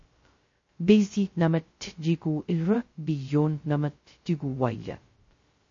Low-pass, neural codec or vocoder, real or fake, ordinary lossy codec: 7.2 kHz; codec, 16 kHz, 0.2 kbps, FocalCodec; fake; MP3, 32 kbps